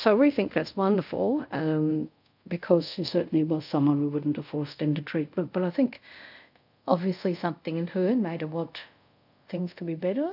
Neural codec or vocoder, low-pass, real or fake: codec, 24 kHz, 0.5 kbps, DualCodec; 5.4 kHz; fake